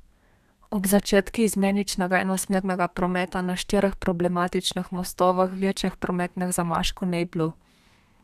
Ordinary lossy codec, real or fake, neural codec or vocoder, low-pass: none; fake; codec, 32 kHz, 1.9 kbps, SNAC; 14.4 kHz